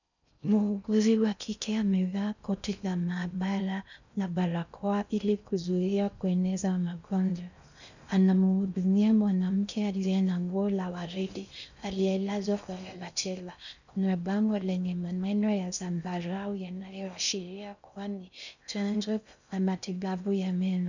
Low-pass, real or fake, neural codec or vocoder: 7.2 kHz; fake; codec, 16 kHz in and 24 kHz out, 0.6 kbps, FocalCodec, streaming, 2048 codes